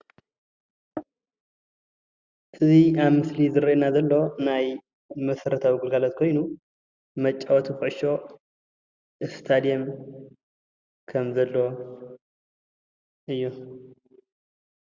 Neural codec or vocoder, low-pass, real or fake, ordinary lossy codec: none; 7.2 kHz; real; Opus, 64 kbps